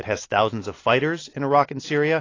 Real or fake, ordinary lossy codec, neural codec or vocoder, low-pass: real; AAC, 32 kbps; none; 7.2 kHz